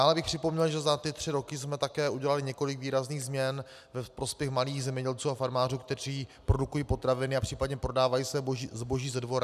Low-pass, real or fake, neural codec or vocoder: 14.4 kHz; real; none